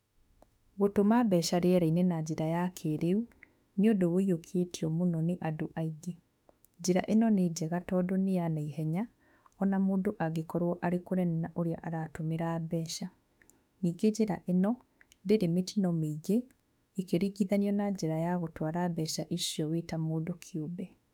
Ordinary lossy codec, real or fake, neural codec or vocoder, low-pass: none; fake; autoencoder, 48 kHz, 32 numbers a frame, DAC-VAE, trained on Japanese speech; 19.8 kHz